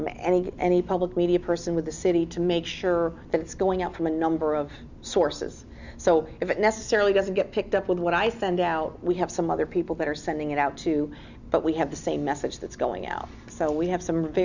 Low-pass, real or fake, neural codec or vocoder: 7.2 kHz; real; none